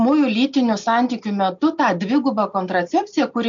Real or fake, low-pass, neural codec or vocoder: real; 7.2 kHz; none